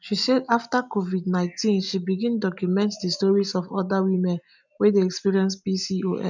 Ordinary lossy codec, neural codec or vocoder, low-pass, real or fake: none; none; 7.2 kHz; real